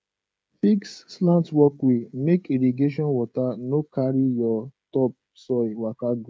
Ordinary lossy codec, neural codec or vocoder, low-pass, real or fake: none; codec, 16 kHz, 16 kbps, FreqCodec, smaller model; none; fake